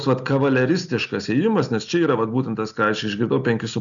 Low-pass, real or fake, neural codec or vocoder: 7.2 kHz; real; none